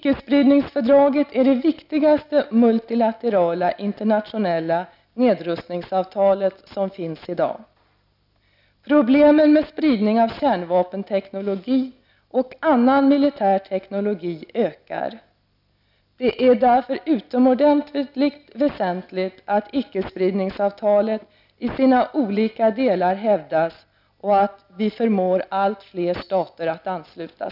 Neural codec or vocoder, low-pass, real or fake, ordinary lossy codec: none; 5.4 kHz; real; none